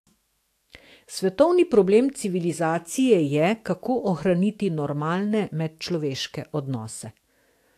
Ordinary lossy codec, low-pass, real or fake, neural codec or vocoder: AAC, 64 kbps; 14.4 kHz; fake; autoencoder, 48 kHz, 128 numbers a frame, DAC-VAE, trained on Japanese speech